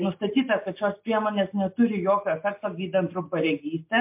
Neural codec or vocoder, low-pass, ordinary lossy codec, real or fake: none; 3.6 kHz; MP3, 32 kbps; real